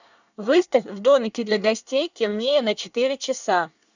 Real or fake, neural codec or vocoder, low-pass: fake; codec, 24 kHz, 1 kbps, SNAC; 7.2 kHz